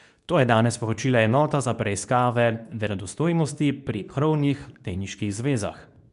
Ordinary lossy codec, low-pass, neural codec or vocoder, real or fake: none; 10.8 kHz; codec, 24 kHz, 0.9 kbps, WavTokenizer, medium speech release version 2; fake